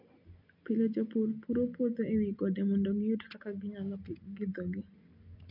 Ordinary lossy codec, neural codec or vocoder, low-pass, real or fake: none; none; 5.4 kHz; real